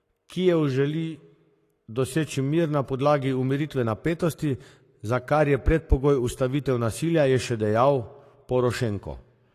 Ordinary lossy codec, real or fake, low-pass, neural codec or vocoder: AAC, 48 kbps; fake; 14.4 kHz; codec, 44.1 kHz, 7.8 kbps, Pupu-Codec